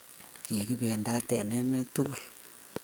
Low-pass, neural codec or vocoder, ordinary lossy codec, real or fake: none; codec, 44.1 kHz, 2.6 kbps, SNAC; none; fake